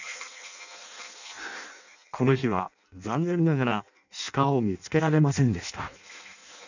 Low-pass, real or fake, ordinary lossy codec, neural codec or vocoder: 7.2 kHz; fake; none; codec, 16 kHz in and 24 kHz out, 0.6 kbps, FireRedTTS-2 codec